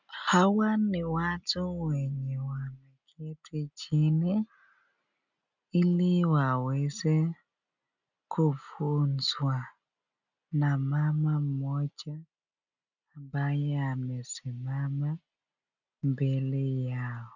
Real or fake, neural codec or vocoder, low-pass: real; none; 7.2 kHz